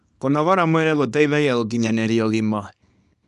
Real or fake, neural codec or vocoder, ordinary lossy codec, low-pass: fake; codec, 24 kHz, 0.9 kbps, WavTokenizer, small release; none; 10.8 kHz